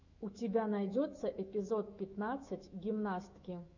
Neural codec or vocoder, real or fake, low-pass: autoencoder, 48 kHz, 128 numbers a frame, DAC-VAE, trained on Japanese speech; fake; 7.2 kHz